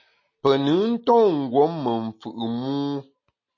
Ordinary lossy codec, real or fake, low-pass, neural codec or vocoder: MP3, 32 kbps; real; 7.2 kHz; none